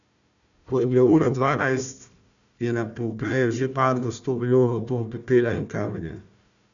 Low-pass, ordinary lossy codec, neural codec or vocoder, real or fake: 7.2 kHz; none; codec, 16 kHz, 1 kbps, FunCodec, trained on Chinese and English, 50 frames a second; fake